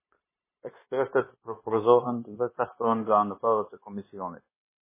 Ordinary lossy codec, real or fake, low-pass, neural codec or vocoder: MP3, 16 kbps; fake; 3.6 kHz; codec, 16 kHz, 0.9 kbps, LongCat-Audio-Codec